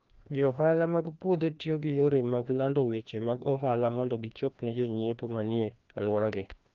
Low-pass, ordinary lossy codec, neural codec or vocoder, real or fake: 7.2 kHz; Opus, 32 kbps; codec, 16 kHz, 1 kbps, FreqCodec, larger model; fake